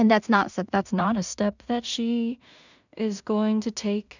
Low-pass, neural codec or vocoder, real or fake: 7.2 kHz; codec, 16 kHz in and 24 kHz out, 0.4 kbps, LongCat-Audio-Codec, two codebook decoder; fake